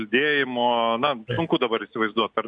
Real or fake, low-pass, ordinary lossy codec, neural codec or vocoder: real; 9.9 kHz; MP3, 64 kbps; none